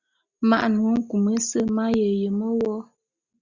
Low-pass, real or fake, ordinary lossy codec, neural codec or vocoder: 7.2 kHz; real; Opus, 64 kbps; none